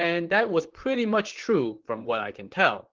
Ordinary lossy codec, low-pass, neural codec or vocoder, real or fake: Opus, 16 kbps; 7.2 kHz; vocoder, 44.1 kHz, 128 mel bands, Pupu-Vocoder; fake